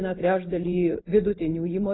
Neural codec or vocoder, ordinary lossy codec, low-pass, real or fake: none; AAC, 16 kbps; 7.2 kHz; real